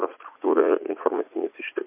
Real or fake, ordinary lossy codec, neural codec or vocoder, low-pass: fake; MP3, 32 kbps; vocoder, 44.1 kHz, 80 mel bands, Vocos; 3.6 kHz